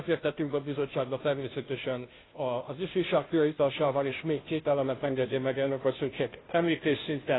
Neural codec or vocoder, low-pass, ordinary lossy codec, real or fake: codec, 16 kHz, 0.5 kbps, FunCodec, trained on Chinese and English, 25 frames a second; 7.2 kHz; AAC, 16 kbps; fake